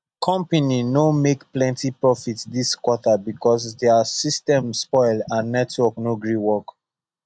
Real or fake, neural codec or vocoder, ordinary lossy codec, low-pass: real; none; none; 9.9 kHz